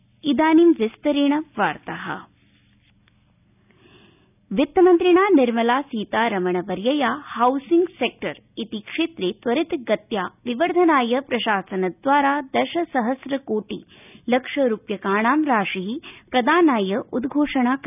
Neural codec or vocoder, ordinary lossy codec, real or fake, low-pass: none; none; real; 3.6 kHz